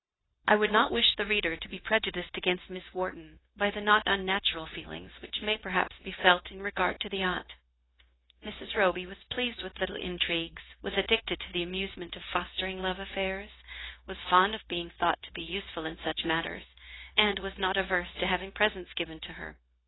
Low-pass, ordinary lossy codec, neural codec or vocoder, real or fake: 7.2 kHz; AAC, 16 kbps; codec, 16 kHz, 0.9 kbps, LongCat-Audio-Codec; fake